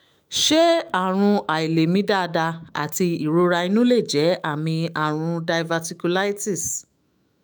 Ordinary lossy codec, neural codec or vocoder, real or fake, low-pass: none; autoencoder, 48 kHz, 128 numbers a frame, DAC-VAE, trained on Japanese speech; fake; none